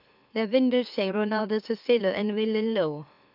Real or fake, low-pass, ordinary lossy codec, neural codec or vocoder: fake; 5.4 kHz; none; autoencoder, 44.1 kHz, a latent of 192 numbers a frame, MeloTTS